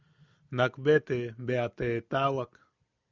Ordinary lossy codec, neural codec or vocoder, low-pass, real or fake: Opus, 64 kbps; none; 7.2 kHz; real